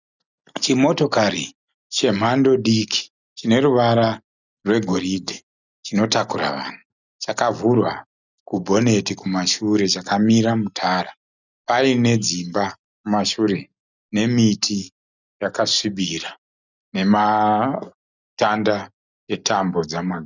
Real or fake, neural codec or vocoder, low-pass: real; none; 7.2 kHz